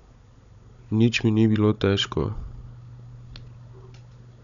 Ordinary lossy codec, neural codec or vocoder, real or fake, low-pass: none; codec, 16 kHz, 16 kbps, FunCodec, trained on Chinese and English, 50 frames a second; fake; 7.2 kHz